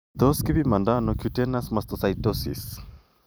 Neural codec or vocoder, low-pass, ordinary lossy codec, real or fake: vocoder, 44.1 kHz, 128 mel bands every 256 samples, BigVGAN v2; none; none; fake